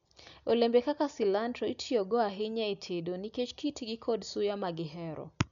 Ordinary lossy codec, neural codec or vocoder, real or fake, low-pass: none; none; real; 7.2 kHz